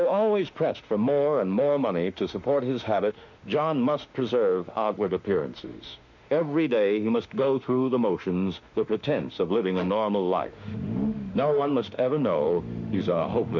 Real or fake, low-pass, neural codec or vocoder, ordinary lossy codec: fake; 7.2 kHz; autoencoder, 48 kHz, 32 numbers a frame, DAC-VAE, trained on Japanese speech; MP3, 64 kbps